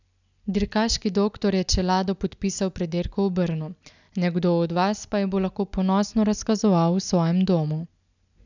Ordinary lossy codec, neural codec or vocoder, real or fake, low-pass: none; none; real; 7.2 kHz